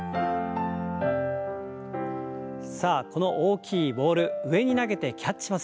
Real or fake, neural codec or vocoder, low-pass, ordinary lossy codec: real; none; none; none